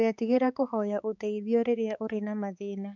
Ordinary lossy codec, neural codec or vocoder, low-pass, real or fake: none; codec, 16 kHz, 4 kbps, X-Codec, HuBERT features, trained on balanced general audio; 7.2 kHz; fake